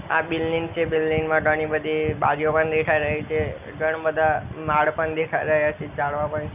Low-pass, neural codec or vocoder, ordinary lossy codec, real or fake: 3.6 kHz; none; none; real